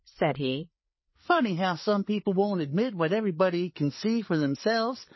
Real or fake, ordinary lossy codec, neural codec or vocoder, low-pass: fake; MP3, 24 kbps; codec, 16 kHz, 4 kbps, FreqCodec, larger model; 7.2 kHz